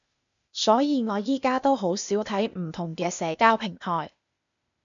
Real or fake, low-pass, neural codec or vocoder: fake; 7.2 kHz; codec, 16 kHz, 0.8 kbps, ZipCodec